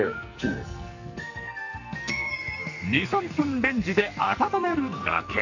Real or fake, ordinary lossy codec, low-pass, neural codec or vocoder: fake; none; 7.2 kHz; codec, 44.1 kHz, 2.6 kbps, SNAC